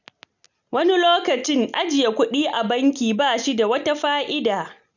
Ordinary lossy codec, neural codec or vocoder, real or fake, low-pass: none; none; real; 7.2 kHz